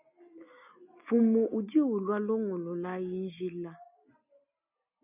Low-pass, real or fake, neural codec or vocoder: 3.6 kHz; real; none